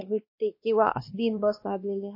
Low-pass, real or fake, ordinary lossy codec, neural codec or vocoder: 5.4 kHz; fake; MP3, 32 kbps; codec, 16 kHz, 1 kbps, X-Codec, WavLM features, trained on Multilingual LibriSpeech